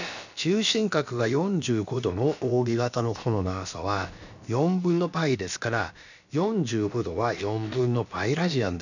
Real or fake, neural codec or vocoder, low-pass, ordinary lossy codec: fake; codec, 16 kHz, about 1 kbps, DyCAST, with the encoder's durations; 7.2 kHz; none